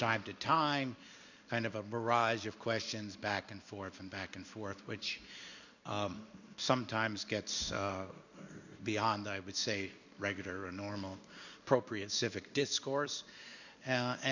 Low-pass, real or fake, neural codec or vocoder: 7.2 kHz; fake; codec, 16 kHz in and 24 kHz out, 1 kbps, XY-Tokenizer